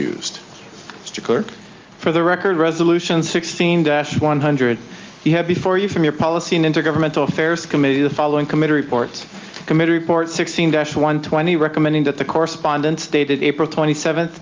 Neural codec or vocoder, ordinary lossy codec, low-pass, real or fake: none; Opus, 32 kbps; 7.2 kHz; real